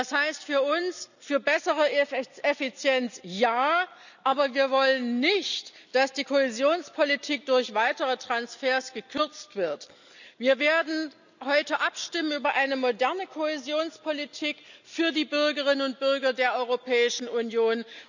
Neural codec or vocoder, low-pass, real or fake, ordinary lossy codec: none; 7.2 kHz; real; none